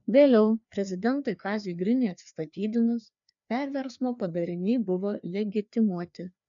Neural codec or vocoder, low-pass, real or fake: codec, 16 kHz, 2 kbps, FreqCodec, larger model; 7.2 kHz; fake